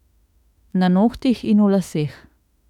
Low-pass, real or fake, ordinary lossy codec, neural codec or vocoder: 19.8 kHz; fake; none; autoencoder, 48 kHz, 32 numbers a frame, DAC-VAE, trained on Japanese speech